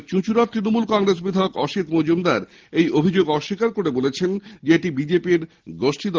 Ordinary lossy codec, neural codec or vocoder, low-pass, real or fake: Opus, 16 kbps; none; 7.2 kHz; real